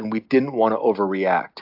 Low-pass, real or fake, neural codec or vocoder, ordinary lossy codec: 5.4 kHz; real; none; AAC, 48 kbps